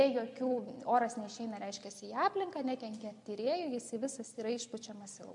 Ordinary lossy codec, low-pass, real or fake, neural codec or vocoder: MP3, 64 kbps; 10.8 kHz; real; none